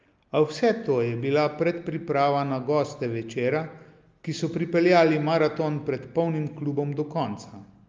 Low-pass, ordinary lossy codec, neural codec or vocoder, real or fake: 7.2 kHz; Opus, 24 kbps; none; real